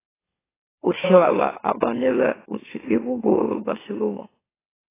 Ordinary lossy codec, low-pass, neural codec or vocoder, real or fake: AAC, 16 kbps; 3.6 kHz; autoencoder, 44.1 kHz, a latent of 192 numbers a frame, MeloTTS; fake